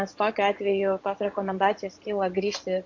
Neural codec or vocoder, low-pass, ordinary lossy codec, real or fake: vocoder, 24 kHz, 100 mel bands, Vocos; 7.2 kHz; AAC, 48 kbps; fake